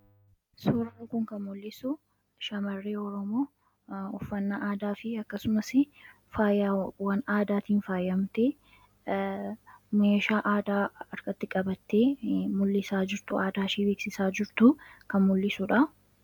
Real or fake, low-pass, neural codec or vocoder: real; 19.8 kHz; none